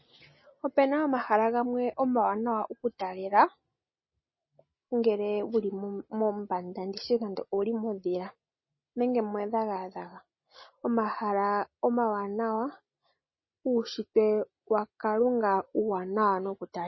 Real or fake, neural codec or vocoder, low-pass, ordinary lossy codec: real; none; 7.2 kHz; MP3, 24 kbps